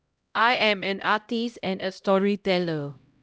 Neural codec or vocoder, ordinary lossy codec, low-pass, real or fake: codec, 16 kHz, 0.5 kbps, X-Codec, HuBERT features, trained on LibriSpeech; none; none; fake